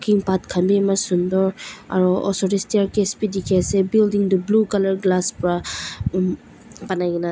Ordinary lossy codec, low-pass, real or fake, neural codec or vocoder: none; none; real; none